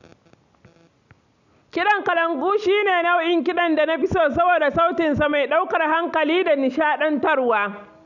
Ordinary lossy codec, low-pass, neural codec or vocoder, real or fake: none; 7.2 kHz; none; real